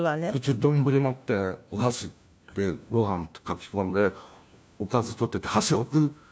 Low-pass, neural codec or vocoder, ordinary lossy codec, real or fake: none; codec, 16 kHz, 1 kbps, FunCodec, trained on LibriTTS, 50 frames a second; none; fake